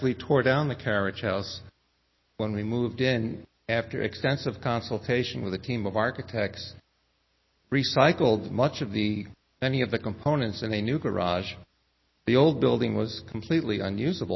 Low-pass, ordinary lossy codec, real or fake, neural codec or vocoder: 7.2 kHz; MP3, 24 kbps; real; none